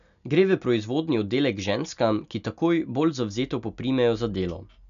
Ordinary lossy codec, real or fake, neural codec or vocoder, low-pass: none; real; none; 7.2 kHz